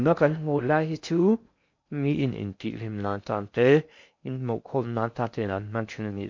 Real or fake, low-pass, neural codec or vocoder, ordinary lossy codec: fake; 7.2 kHz; codec, 16 kHz in and 24 kHz out, 0.6 kbps, FocalCodec, streaming, 2048 codes; MP3, 48 kbps